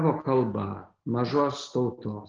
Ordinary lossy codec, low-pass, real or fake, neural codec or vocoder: Opus, 16 kbps; 7.2 kHz; real; none